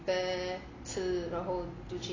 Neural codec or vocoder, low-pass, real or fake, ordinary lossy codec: none; 7.2 kHz; real; AAC, 32 kbps